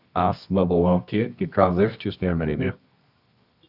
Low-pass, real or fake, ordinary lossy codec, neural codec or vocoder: 5.4 kHz; fake; Opus, 64 kbps; codec, 24 kHz, 0.9 kbps, WavTokenizer, medium music audio release